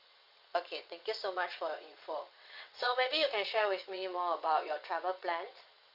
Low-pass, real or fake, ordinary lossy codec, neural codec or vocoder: 5.4 kHz; fake; MP3, 48 kbps; vocoder, 22.05 kHz, 80 mel bands, WaveNeXt